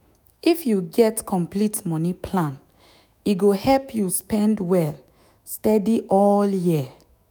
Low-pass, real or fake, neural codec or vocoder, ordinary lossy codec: none; fake; autoencoder, 48 kHz, 128 numbers a frame, DAC-VAE, trained on Japanese speech; none